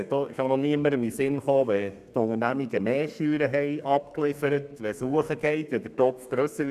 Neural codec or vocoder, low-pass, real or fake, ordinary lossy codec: codec, 32 kHz, 1.9 kbps, SNAC; 14.4 kHz; fake; none